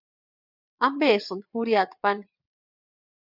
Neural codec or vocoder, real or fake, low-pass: vocoder, 22.05 kHz, 80 mel bands, WaveNeXt; fake; 5.4 kHz